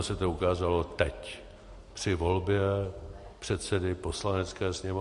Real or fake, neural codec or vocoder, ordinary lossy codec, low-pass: real; none; MP3, 48 kbps; 14.4 kHz